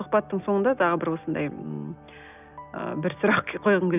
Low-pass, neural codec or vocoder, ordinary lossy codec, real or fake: 3.6 kHz; none; none; real